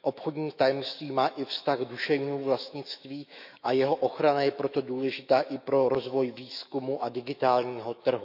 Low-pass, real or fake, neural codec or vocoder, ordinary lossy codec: 5.4 kHz; fake; autoencoder, 48 kHz, 128 numbers a frame, DAC-VAE, trained on Japanese speech; none